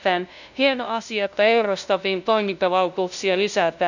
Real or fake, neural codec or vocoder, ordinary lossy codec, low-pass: fake; codec, 16 kHz, 0.5 kbps, FunCodec, trained on LibriTTS, 25 frames a second; none; 7.2 kHz